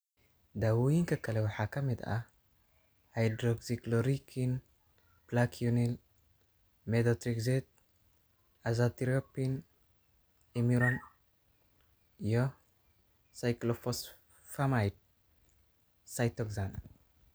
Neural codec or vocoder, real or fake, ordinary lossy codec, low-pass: none; real; none; none